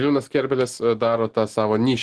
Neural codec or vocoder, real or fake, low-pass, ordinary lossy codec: none; real; 10.8 kHz; Opus, 16 kbps